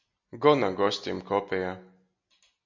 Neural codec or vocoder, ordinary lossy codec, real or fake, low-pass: none; MP3, 64 kbps; real; 7.2 kHz